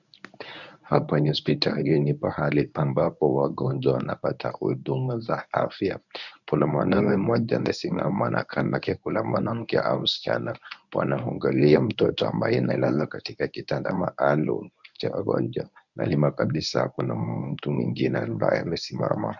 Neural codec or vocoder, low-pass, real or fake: codec, 24 kHz, 0.9 kbps, WavTokenizer, medium speech release version 1; 7.2 kHz; fake